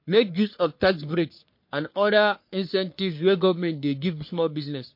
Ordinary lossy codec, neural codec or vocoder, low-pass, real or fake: MP3, 32 kbps; codec, 44.1 kHz, 3.4 kbps, Pupu-Codec; 5.4 kHz; fake